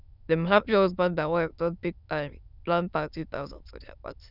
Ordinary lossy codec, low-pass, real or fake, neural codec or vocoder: none; 5.4 kHz; fake; autoencoder, 22.05 kHz, a latent of 192 numbers a frame, VITS, trained on many speakers